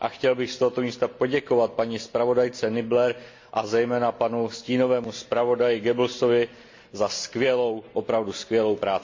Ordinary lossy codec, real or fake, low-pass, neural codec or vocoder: MP3, 48 kbps; real; 7.2 kHz; none